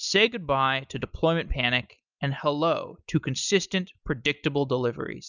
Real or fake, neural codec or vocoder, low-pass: real; none; 7.2 kHz